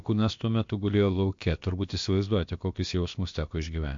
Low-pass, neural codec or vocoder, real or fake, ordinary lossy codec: 7.2 kHz; codec, 16 kHz, about 1 kbps, DyCAST, with the encoder's durations; fake; MP3, 48 kbps